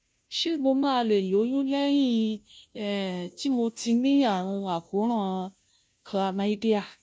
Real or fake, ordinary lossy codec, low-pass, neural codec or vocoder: fake; none; none; codec, 16 kHz, 0.5 kbps, FunCodec, trained on Chinese and English, 25 frames a second